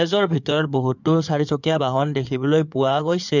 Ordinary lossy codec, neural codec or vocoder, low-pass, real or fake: none; codec, 16 kHz in and 24 kHz out, 2.2 kbps, FireRedTTS-2 codec; 7.2 kHz; fake